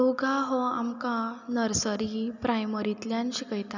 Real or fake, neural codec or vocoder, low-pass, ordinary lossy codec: real; none; 7.2 kHz; none